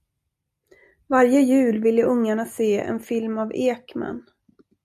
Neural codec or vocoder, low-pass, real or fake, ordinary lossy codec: none; 14.4 kHz; real; AAC, 96 kbps